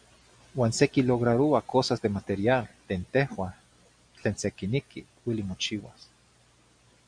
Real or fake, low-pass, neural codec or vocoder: real; 9.9 kHz; none